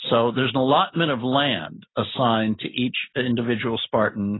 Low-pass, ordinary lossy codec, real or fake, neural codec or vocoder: 7.2 kHz; AAC, 16 kbps; real; none